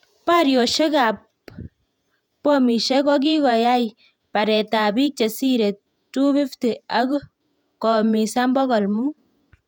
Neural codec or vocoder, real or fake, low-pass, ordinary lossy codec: vocoder, 48 kHz, 128 mel bands, Vocos; fake; 19.8 kHz; none